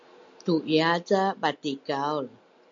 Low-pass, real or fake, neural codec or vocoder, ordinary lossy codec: 7.2 kHz; real; none; MP3, 48 kbps